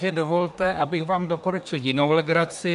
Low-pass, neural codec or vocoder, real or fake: 10.8 kHz; codec, 24 kHz, 1 kbps, SNAC; fake